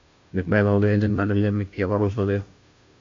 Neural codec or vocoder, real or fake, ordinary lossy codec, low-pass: codec, 16 kHz, 0.5 kbps, FunCodec, trained on Chinese and English, 25 frames a second; fake; AAC, 48 kbps; 7.2 kHz